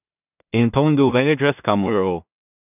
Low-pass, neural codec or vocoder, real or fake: 3.6 kHz; codec, 16 kHz in and 24 kHz out, 0.4 kbps, LongCat-Audio-Codec, two codebook decoder; fake